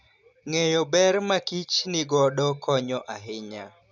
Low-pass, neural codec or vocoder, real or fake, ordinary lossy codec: 7.2 kHz; none; real; none